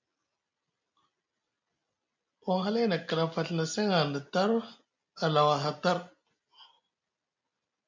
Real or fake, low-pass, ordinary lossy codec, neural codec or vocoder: real; 7.2 kHz; MP3, 64 kbps; none